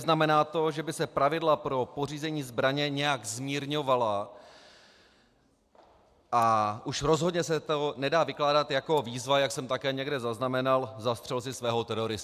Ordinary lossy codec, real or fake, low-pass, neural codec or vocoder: AAC, 96 kbps; real; 14.4 kHz; none